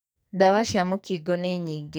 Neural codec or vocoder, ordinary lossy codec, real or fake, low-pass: codec, 44.1 kHz, 2.6 kbps, SNAC; none; fake; none